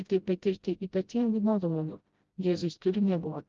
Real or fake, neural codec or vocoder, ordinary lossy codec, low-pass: fake; codec, 16 kHz, 0.5 kbps, FreqCodec, smaller model; Opus, 32 kbps; 7.2 kHz